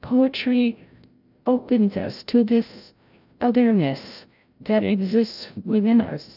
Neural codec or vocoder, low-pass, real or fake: codec, 16 kHz, 0.5 kbps, FreqCodec, larger model; 5.4 kHz; fake